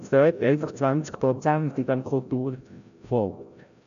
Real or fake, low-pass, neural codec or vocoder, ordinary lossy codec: fake; 7.2 kHz; codec, 16 kHz, 0.5 kbps, FreqCodec, larger model; none